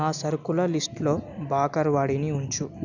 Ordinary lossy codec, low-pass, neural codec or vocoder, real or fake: none; 7.2 kHz; none; real